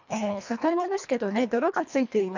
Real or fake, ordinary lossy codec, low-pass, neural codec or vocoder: fake; none; 7.2 kHz; codec, 24 kHz, 1.5 kbps, HILCodec